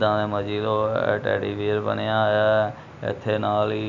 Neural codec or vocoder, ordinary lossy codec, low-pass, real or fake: none; none; 7.2 kHz; real